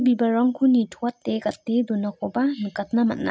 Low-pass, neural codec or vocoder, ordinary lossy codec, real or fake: none; none; none; real